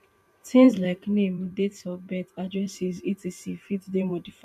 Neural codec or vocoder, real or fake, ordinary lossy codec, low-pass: vocoder, 44.1 kHz, 128 mel bands every 512 samples, BigVGAN v2; fake; MP3, 96 kbps; 14.4 kHz